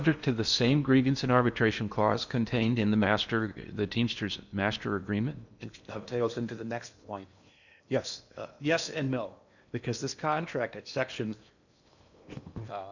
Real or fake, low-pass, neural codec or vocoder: fake; 7.2 kHz; codec, 16 kHz in and 24 kHz out, 0.8 kbps, FocalCodec, streaming, 65536 codes